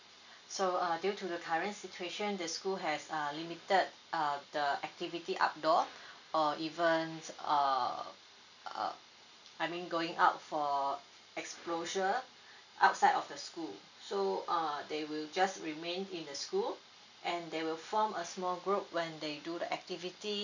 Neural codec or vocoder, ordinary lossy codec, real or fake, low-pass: none; none; real; 7.2 kHz